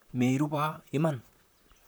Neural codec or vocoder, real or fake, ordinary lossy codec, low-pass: vocoder, 44.1 kHz, 128 mel bands, Pupu-Vocoder; fake; none; none